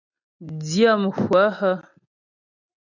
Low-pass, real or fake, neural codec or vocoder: 7.2 kHz; real; none